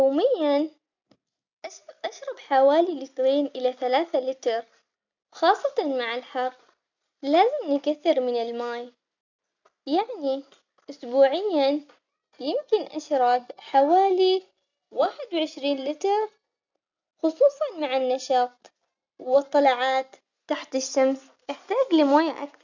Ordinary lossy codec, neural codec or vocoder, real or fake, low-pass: none; none; real; 7.2 kHz